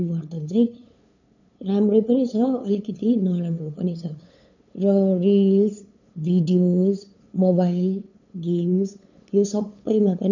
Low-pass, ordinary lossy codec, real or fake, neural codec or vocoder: 7.2 kHz; none; fake; codec, 16 kHz, 8 kbps, FunCodec, trained on LibriTTS, 25 frames a second